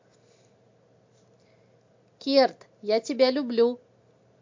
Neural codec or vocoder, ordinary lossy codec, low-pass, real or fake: none; MP3, 48 kbps; 7.2 kHz; real